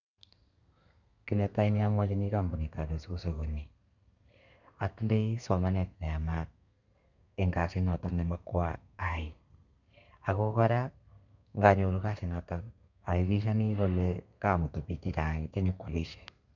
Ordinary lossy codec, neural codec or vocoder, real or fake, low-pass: none; codec, 32 kHz, 1.9 kbps, SNAC; fake; 7.2 kHz